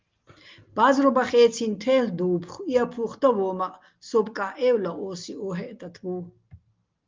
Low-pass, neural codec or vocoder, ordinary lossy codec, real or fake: 7.2 kHz; none; Opus, 32 kbps; real